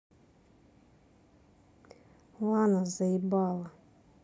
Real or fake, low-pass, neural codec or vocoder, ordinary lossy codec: real; none; none; none